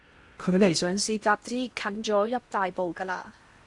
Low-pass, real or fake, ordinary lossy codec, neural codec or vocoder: 10.8 kHz; fake; Opus, 64 kbps; codec, 16 kHz in and 24 kHz out, 0.8 kbps, FocalCodec, streaming, 65536 codes